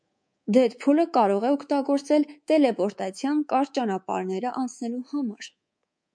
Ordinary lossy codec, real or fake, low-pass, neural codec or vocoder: MP3, 64 kbps; fake; 9.9 kHz; codec, 24 kHz, 3.1 kbps, DualCodec